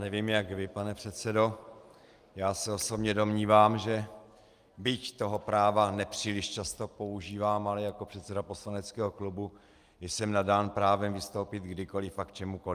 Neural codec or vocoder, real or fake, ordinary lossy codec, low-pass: none; real; Opus, 32 kbps; 14.4 kHz